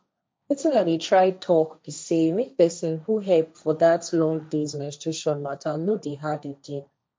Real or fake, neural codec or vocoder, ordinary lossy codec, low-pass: fake; codec, 16 kHz, 1.1 kbps, Voila-Tokenizer; none; none